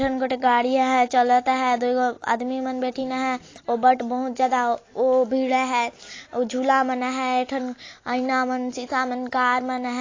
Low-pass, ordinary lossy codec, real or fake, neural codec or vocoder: 7.2 kHz; AAC, 32 kbps; real; none